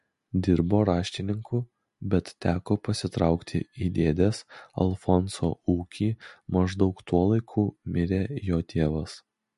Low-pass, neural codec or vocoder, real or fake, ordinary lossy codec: 14.4 kHz; none; real; MP3, 48 kbps